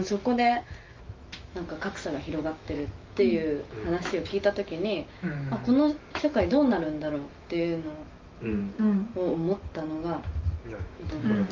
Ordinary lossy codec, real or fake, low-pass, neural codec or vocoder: Opus, 32 kbps; fake; 7.2 kHz; autoencoder, 48 kHz, 128 numbers a frame, DAC-VAE, trained on Japanese speech